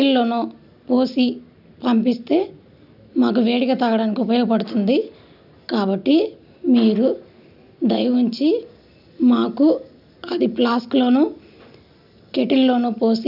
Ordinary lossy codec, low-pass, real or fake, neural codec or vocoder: none; 5.4 kHz; real; none